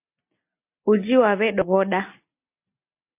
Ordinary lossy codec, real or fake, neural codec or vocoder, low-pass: MP3, 24 kbps; real; none; 3.6 kHz